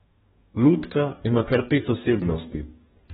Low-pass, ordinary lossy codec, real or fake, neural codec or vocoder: 19.8 kHz; AAC, 16 kbps; fake; codec, 44.1 kHz, 2.6 kbps, DAC